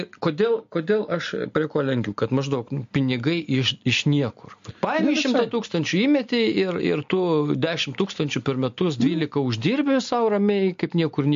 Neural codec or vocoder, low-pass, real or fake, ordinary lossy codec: none; 7.2 kHz; real; MP3, 48 kbps